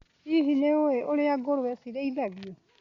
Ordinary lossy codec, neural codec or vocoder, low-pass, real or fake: Opus, 64 kbps; none; 7.2 kHz; real